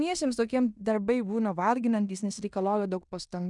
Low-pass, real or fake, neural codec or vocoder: 10.8 kHz; fake; codec, 16 kHz in and 24 kHz out, 0.9 kbps, LongCat-Audio-Codec, fine tuned four codebook decoder